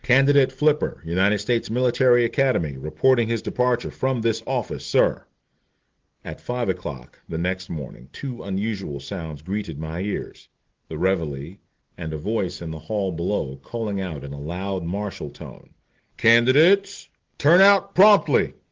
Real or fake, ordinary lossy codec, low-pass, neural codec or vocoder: real; Opus, 16 kbps; 7.2 kHz; none